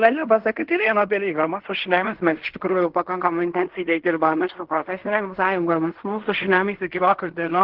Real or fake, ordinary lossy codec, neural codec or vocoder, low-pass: fake; Opus, 16 kbps; codec, 16 kHz in and 24 kHz out, 0.4 kbps, LongCat-Audio-Codec, fine tuned four codebook decoder; 5.4 kHz